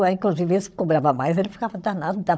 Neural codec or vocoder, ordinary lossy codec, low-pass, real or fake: codec, 16 kHz, 16 kbps, FunCodec, trained on Chinese and English, 50 frames a second; none; none; fake